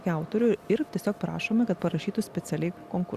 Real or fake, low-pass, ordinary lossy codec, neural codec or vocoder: real; 14.4 kHz; Opus, 64 kbps; none